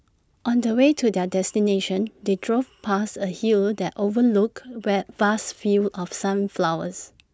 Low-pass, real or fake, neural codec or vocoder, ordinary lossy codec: none; real; none; none